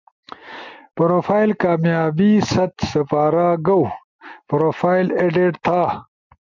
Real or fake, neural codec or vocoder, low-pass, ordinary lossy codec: real; none; 7.2 kHz; MP3, 64 kbps